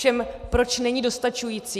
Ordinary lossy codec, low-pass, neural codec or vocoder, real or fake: AAC, 96 kbps; 14.4 kHz; none; real